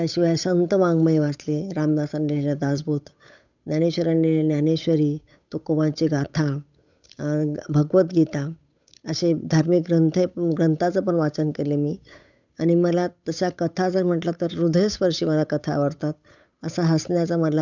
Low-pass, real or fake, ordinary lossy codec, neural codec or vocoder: 7.2 kHz; fake; none; codec, 16 kHz, 8 kbps, FunCodec, trained on Chinese and English, 25 frames a second